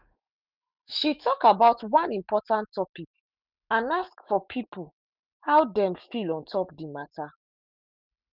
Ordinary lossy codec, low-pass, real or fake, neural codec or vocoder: none; 5.4 kHz; fake; autoencoder, 48 kHz, 128 numbers a frame, DAC-VAE, trained on Japanese speech